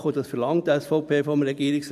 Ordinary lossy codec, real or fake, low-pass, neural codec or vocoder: none; fake; 14.4 kHz; vocoder, 44.1 kHz, 128 mel bands every 512 samples, BigVGAN v2